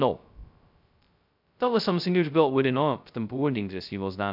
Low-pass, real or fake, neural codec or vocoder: 5.4 kHz; fake; codec, 16 kHz, 0.2 kbps, FocalCodec